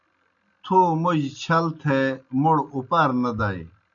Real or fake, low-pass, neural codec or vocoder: real; 7.2 kHz; none